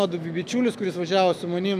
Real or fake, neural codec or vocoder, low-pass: fake; autoencoder, 48 kHz, 128 numbers a frame, DAC-VAE, trained on Japanese speech; 14.4 kHz